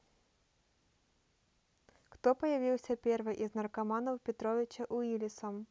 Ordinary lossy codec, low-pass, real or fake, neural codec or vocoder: none; none; real; none